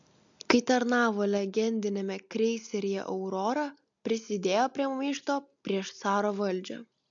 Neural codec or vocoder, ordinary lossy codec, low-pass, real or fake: none; MP3, 64 kbps; 7.2 kHz; real